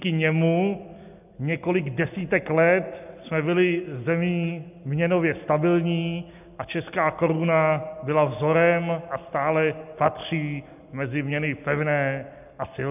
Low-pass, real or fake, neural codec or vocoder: 3.6 kHz; real; none